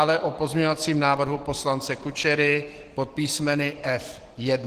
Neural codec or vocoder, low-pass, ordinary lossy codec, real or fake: codec, 44.1 kHz, 7.8 kbps, DAC; 14.4 kHz; Opus, 16 kbps; fake